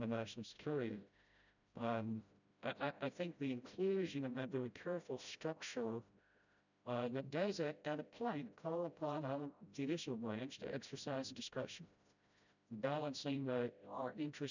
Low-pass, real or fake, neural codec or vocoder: 7.2 kHz; fake; codec, 16 kHz, 0.5 kbps, FreqCodec, smaller model